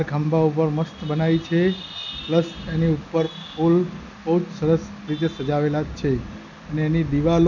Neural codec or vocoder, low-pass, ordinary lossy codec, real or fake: none; 7.2 kHz; none; real